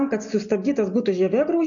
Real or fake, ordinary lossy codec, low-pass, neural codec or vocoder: real; MP3, 96 kbps; 7.2 kHz; none